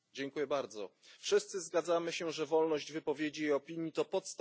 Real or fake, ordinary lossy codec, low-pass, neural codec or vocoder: real; none; none; none